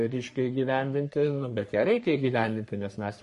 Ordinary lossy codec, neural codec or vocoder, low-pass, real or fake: MP3, 48 kbps; codec, 44.1 kHz, 3.4 kbps, Pupu-Codec; 14.4 kHz; fake